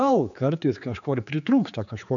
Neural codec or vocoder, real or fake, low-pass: codec, 16 kHz, 4 kbps, X-Codec, HuBERT features, trained on general audio; fake; 7.2 kHz